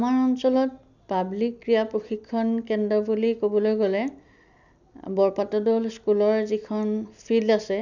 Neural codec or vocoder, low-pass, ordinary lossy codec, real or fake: none; 7.2 kHz; none; real